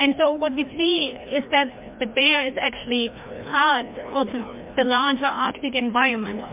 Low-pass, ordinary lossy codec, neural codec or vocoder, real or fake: 3.6 kHz; MP3, 32 kbps; codec, 16 kHz, 1 kbps, FreqCodec, larger model; fake